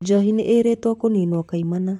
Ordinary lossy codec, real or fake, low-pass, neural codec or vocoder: MP3, 64 kbps; fake; 19.8 kHz; vocoder, 44.1 kHz, 128 mel bands, Pupu-Vocoder